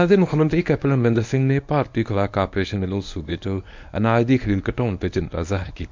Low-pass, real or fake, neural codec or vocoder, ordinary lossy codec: 7.2 kHz; fake; codec, 24 kHz, 0.9 kbps, WavTokenizer, medium speech release version 1; MP3, 64 kbps